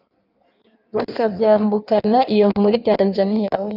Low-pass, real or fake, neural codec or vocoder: 5.4 kHz; fake; codec, 16 kHz in and 24 kHz out, 1.1 kbps, FireRedTTS-2 codec